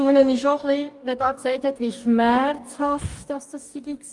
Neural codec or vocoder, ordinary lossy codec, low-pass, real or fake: codec, 44.1 kHz, 2.6 kbps, DAC; Opus, 32 kbps; 10.8 kHz; fake